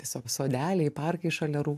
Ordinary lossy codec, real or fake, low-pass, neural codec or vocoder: AAC, 96 kbps; real; 14.4 kHz; none